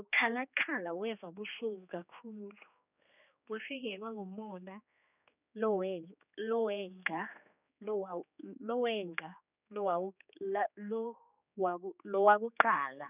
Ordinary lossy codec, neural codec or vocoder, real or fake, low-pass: none; codec, 16 kHz, 2 kbps, X-Codec, HuBERT features, trained on general audio; fake; 3.6 kHz